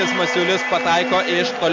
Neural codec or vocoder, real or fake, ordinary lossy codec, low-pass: none; real; MP3, 64 kbps; 7.2 kHz